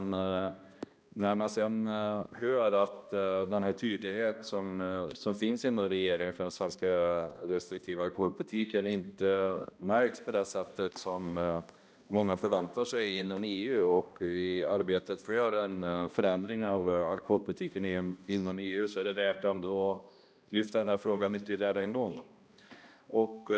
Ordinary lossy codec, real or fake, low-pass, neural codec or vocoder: none; fake; none; codec, 16 kHz, 1 kbps, X-Codec, HuBERT features, trained on balanced general audio